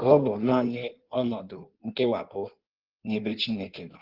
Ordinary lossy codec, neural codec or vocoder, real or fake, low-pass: Opus, 16 kbps; codec, 16 kHz in and 24 kHz out, 1.1 kbps, FireRedTTS-2 codec; fake; 5.4 kHz